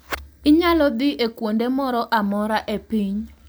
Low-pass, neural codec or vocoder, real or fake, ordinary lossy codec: none; none; real; none